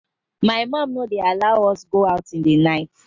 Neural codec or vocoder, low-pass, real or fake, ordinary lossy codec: none; 7.2 kHz; real; MP3, 48 kbps